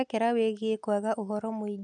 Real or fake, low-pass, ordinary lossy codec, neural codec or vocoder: real; 9.9 kHz; none; none